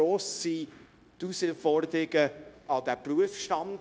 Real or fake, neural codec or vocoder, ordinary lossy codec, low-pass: fake; codec, 16 kHz, 0.9 kbps, LongCat-Audio-Codec; none; none